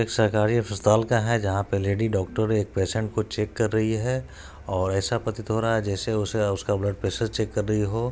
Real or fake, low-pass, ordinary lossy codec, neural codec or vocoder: real; none; none; none